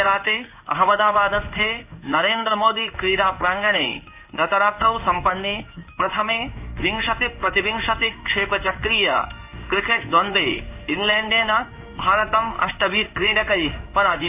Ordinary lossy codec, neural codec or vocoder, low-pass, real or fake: AAC, 32 kbps; codec, 16 kHz in and 24 kHz out, 1 kbps, XY-Tokenizer; 3.6 kHz; fake